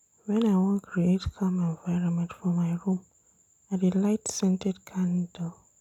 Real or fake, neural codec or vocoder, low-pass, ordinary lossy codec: real; none; 19.8 kHz; none